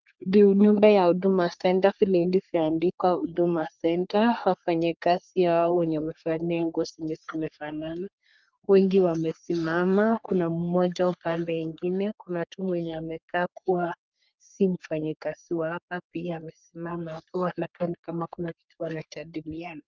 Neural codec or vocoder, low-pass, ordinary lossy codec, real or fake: codec, 44.1 kHz, 3.4 kbps, Pupu-Codec; 7.2 kHz; Opus, 32 kbps; fake